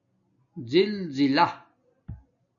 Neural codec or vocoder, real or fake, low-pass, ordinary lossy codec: none; real; 7.2 kHz; MP3, 96 kbps